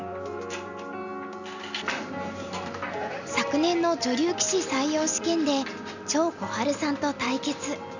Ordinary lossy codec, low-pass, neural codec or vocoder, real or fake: none; 7.2 kHz; none; real